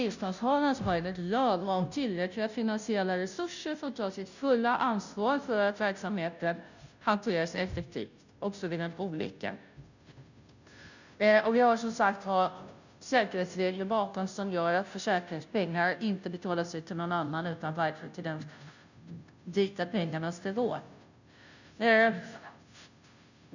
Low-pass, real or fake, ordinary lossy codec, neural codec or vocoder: 7.2 kHz; fake; none; codec, 16 kHz, 0.5 kbps, FunCodec, trained on Chinese and English, 25 frames a second